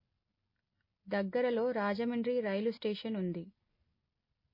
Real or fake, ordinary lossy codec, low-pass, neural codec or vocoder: real; MP3, 24 kbps; 5.4 kHz; none